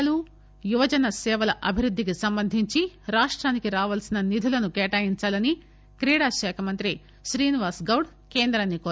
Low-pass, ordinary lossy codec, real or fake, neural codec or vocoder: none; none; real; none